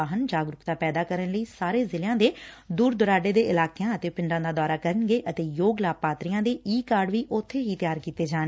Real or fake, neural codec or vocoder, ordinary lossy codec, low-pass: real; none; none; none